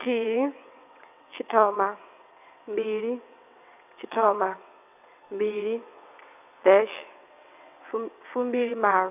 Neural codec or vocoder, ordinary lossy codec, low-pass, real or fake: vocoder, 22.05 kHz, 80 mel bands, WaveNeXt; none; 3.6 kHz; fake